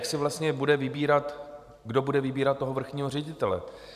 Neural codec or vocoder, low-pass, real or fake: vocoder, 44.1 kHz, 128 mel bands every 512 samples, BigVGAN v2; 14.4 kHz; fake